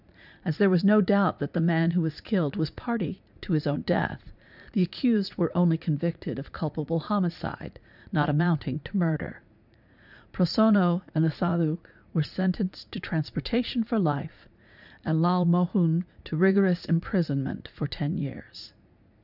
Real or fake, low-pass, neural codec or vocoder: fake; 5.4 kHz; vocoder, 44.1 kHz, 80 mel bands, Vocos